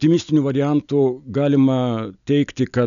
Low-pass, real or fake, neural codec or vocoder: 7.2 kHz; real; none